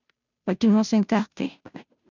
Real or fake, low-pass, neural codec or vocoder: fake; 7.2 kHz; codec, 16 kHz, 0.5 kbps, FunCodec, trained on Chinese and English, 25 frames a second